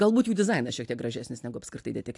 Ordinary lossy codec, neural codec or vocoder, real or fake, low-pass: AAC, 64 kbps; none; real; 10.8 kHz